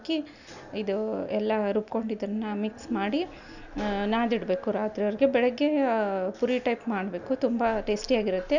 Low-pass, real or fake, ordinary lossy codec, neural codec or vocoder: 7.2 kHz; real; none; none